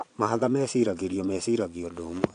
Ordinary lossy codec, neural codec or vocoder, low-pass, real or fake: AAC, 64 kbps; vocoder, 22.05 kHz, 80 mel bands, Vocos; 9.9 kHz; fake